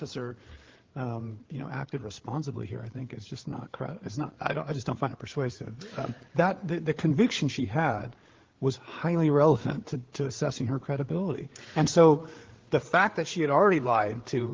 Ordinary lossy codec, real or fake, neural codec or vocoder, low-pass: Opus, 16 kbps; fake; codec, 16 kHz, 8 kbps, FreqCodec, larger model; 7.2 kHz